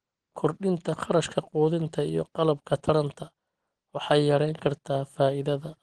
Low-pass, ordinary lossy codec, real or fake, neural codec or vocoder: 10.8 kHz; Opus, 16 kbps; real; none